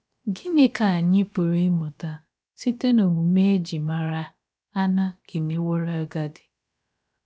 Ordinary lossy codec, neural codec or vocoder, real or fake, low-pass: none; codec, 16 kHz, about 1 kbps, DyCAST, with the encoder's durations; fake; none